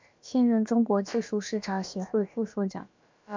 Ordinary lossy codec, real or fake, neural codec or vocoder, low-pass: MP3, 64 kbps; fake; codec, 16 kHz, about 1 kbps, DyCAST, with the encoder's durations; 7.2 kHz